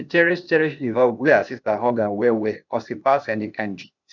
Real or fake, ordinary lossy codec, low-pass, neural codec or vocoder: fake; none; 7.2 kHz; codec, 16 kHz, 0.8 kbps, ZipCodec